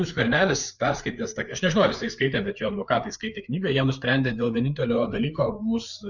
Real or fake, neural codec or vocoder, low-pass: fake; codec, 16 kHz, 4 kbps, FreqCodec, larger model; 7.2 kHz